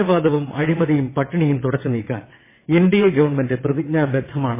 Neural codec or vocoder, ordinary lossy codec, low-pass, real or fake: vocoder, 22.05 kHz, 80 mel bands, WaveNeXt; MP3, 16 kbps; 3.6 kHz; fake